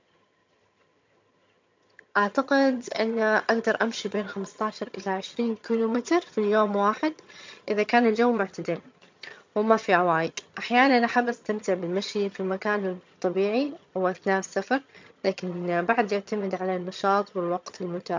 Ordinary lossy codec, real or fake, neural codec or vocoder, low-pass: MP3, 64 kbps; fake; vocoder, 22.05 kHz, 80 mel bands, HiFi-GAN; 7.2 kHz